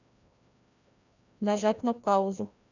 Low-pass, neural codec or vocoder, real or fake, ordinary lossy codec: 7.2 kHz; codec, 16 kHz, 1 kbps, FreqCodec, larger model; fake; none